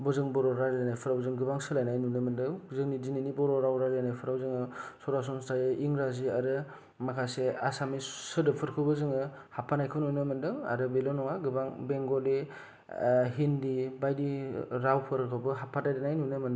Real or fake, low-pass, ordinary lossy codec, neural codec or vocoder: real; none; none; none